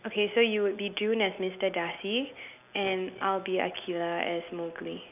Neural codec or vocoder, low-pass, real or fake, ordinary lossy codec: none; 3.6 kHz; real; none